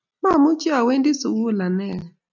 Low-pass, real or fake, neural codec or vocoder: 7.2 kHz; real; none